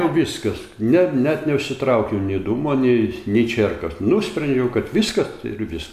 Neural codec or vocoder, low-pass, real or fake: none; 14.4 kHz; real